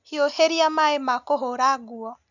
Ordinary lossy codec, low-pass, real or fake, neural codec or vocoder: none; 7.2 kHz; real; none